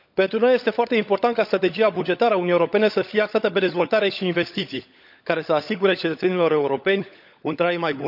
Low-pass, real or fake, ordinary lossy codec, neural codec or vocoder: 5.4 kHz; fake; none; codec, 16 kHz, 16 kbps, FunCodec, trained on LibriTTS, 50 frames a second